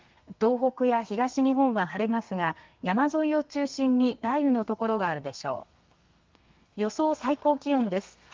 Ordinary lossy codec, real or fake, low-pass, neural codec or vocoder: Opus, 32 kbps; fake; 7.2 kHz; codec, 32 kHz, 1.9 kbps, SNAC